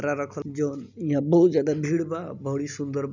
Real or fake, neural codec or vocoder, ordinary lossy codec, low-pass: real; none; none; 7.2 kHz